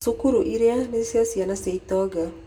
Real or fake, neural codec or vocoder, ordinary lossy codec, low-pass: real; none; none; 19.8 kHz